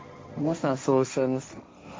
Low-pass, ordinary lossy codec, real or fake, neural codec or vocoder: none; none; fake; codec, 16 kHz, 1.1 kbps, Voila-Tokenizer